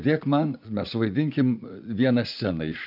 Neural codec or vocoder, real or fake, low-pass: vocoder, 44.1 kHz, 80 mel bands, Vocos; fake; 5.4 kHz